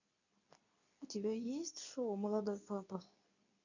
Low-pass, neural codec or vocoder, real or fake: 7.2 kHz; codec, 24 kHz, 0.9 kbps, WavTokenizer, medium speech release version 2; fake